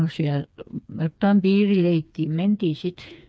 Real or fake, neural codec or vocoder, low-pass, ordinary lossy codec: fake; codec, 16 kHz, 4 kbps, FreqCodec, smaller model; none; none